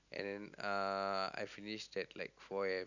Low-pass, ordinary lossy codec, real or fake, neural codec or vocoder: 7.2 kHz; none; real; none